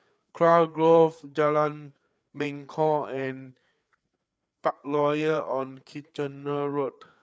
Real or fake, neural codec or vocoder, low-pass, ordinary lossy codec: fake; codec, 16 kHz, 4 kbps, FreqCodec, larger model; none; none